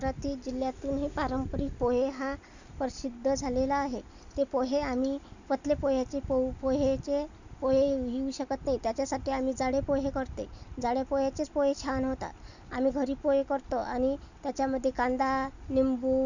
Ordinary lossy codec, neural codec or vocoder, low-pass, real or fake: none; none; 7.2 kHz; real